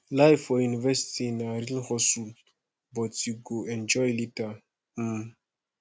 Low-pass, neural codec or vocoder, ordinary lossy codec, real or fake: none; none; none; real